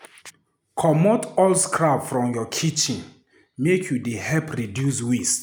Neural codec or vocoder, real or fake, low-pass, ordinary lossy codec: none; real; none; none